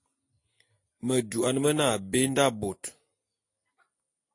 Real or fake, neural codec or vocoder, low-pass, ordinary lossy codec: real; none; 10.8 kHz; AAC, 48 kbps